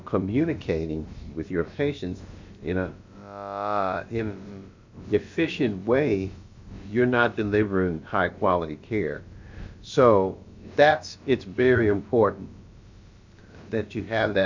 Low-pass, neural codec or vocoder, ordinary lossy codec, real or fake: 7.2 kHz; codec, 16 kHz, about 1 kbps, DyCAST, with the encoder's durations; MP3, 64 kbps; fake